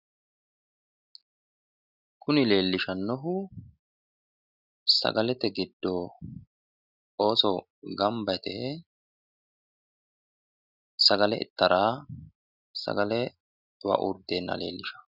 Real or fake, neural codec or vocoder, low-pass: real; none; 5.4 kHz